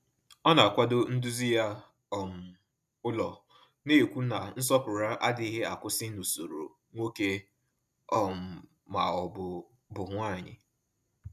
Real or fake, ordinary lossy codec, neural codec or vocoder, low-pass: real; none; none; 14.4 kHz